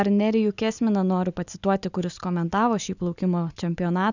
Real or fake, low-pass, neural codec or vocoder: real; 7.2 kHz; none